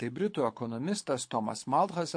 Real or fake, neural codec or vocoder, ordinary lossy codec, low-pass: fake; codec, 24 kHz, 6 kbps, HILCodec; MP3, 48 kbps; 9.9 kHz